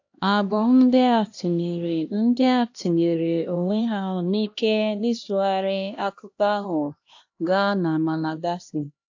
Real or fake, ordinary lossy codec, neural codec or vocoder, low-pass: fake; AAC, 48 kbps; codec, 16 kHz, 1 kbps, X-Codec, HuBERT features, trained on LibriSpeech; 7.2 kHz